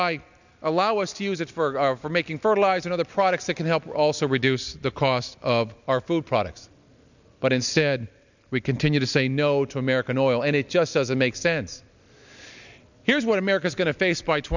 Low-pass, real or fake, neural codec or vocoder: 7.2 kHz; real; none